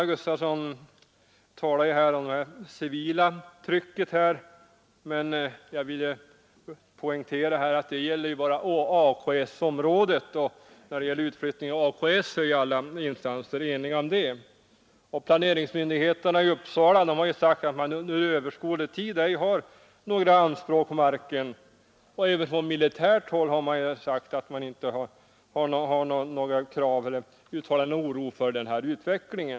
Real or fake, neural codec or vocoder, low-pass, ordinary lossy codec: real; none; none; none